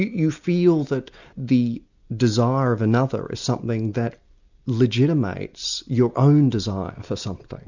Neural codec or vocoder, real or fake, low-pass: none; real; 7.2 kHz